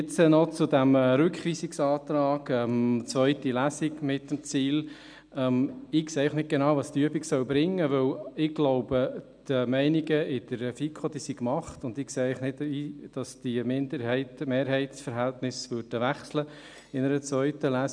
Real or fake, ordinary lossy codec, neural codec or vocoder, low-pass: real; none; none; 9.9 kHz